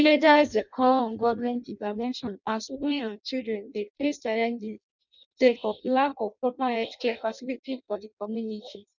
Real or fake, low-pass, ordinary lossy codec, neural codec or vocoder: fake; 7.2 kHz; none; codec, 16 kHz in and 24 kHz out, 0.6 kbps, FireRedTTS-2 codec